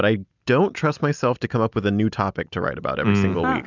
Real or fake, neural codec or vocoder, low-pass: real; none; 7.2 kHz